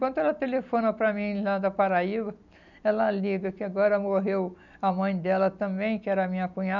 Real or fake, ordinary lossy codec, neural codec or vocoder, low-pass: real; none; none; 7.2 kHz